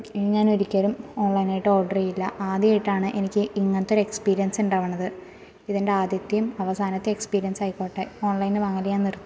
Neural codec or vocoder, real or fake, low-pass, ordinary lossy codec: none; real; none; none